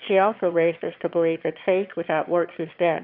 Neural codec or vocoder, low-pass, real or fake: autoencoder, 22.05 kHz, a latent of 192 numbers a frame, VITS, trained on one speaker; 5.4 kHz; fake